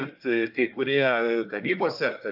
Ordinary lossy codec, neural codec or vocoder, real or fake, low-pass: AAC, 48 kbps; codec, 24 kHz, 1 kbps, SNAC; fake; 5.4 kHz